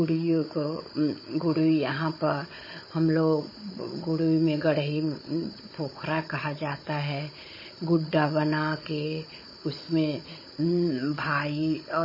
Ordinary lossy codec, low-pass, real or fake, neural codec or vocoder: MP3, 24 kbps; 5.4 kHz; fake; codec, 16 kHz, 16 kbps, FunCodec, trained on Chinese and English, 50 frames a second